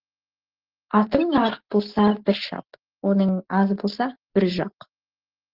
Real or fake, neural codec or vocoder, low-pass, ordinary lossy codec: real; none; 5.4 kHz; Opus, 16 kbps